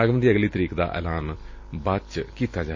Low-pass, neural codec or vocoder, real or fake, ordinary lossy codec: 7.2 kHz; none; real; MP3, 32 kbps